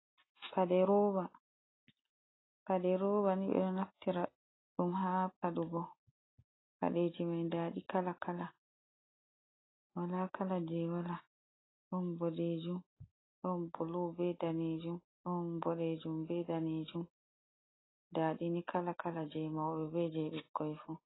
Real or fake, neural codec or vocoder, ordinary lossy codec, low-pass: real; none; AAC, 16 kbps; 7.2 kHz